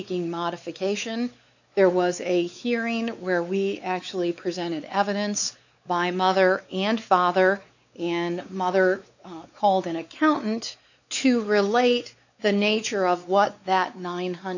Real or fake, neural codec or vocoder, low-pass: fake; codec, 16 kHz, 4 kbps, X-Codec, WavLM features, trained on Multilingual LibriSpeech; 7.2 kHz